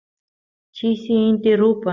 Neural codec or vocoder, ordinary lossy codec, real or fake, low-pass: none; Opus, 64 kbps; real; 7.2 kHz